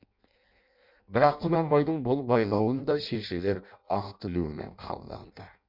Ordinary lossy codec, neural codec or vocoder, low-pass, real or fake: MP3, 48 kbps; codec, 16 kHz in and 24 kHz out, 0.6 kbps, FireRedTTS-2 codec; 5.4 kHz; fake